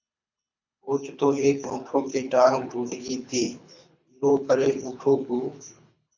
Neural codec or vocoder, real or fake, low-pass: codec, 24 kHz, 3 kbps, HILCodec; fake; 7.2 kHz